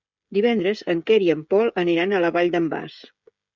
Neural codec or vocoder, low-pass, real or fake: codec, 16 kHz, 16 kbps, FreqCodec, smaller model; 7.2 kHz; fake